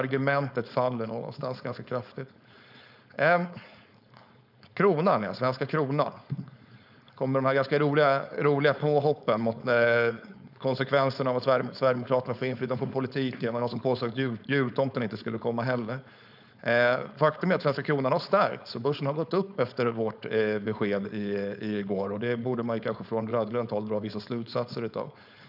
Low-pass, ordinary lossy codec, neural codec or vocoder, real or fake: 5.4 kHz; none; codec, 16 kHz, 4.8 kbps, FACodec; fake